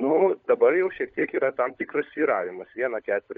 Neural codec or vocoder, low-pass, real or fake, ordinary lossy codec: codec, 16 kHz, 16 kbps, FunCodec, trained on LibriTTS, 50 frames a second; 7.2 kHz; fake; MP3, 64 kbps